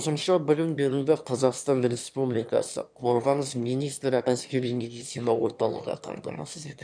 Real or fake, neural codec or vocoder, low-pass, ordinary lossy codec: fake; autoencoder, 22.05 kHz, a latent of 192 numbers a frame, VITS, trained on one speaker; 9.9 kHz; none